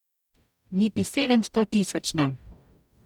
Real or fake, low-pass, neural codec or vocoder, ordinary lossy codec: fake; 19.8 kHz; codec, 44.1 kHz, 0.9 kbps, DAC; none